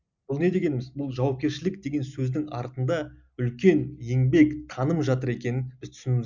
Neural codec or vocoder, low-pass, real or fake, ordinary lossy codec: none; 7.2 kHz; real; none